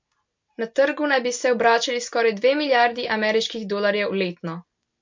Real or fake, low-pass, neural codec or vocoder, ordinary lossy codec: real; 7.2 kHz; none; MP3, 48 kbps